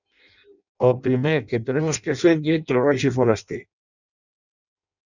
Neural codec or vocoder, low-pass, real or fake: codec, 16 kHz in and 24 kHz out, 0.6 kbps, FireRedTTS-2 codec; 7.2 kHz; fake